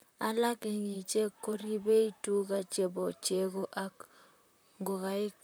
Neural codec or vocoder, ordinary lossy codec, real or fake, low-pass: vocoder, 44.1 kHz, 128 mel bands, Pupu-Vocoder; none; fake; none